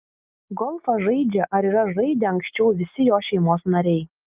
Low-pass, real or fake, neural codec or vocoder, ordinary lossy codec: 3.6 kHz; real; none; Opus, 24 kbps